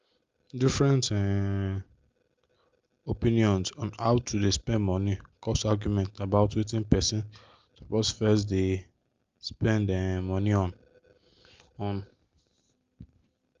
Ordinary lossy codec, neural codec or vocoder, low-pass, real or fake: Opus, 32 kbps; none; 7.2 kHz; real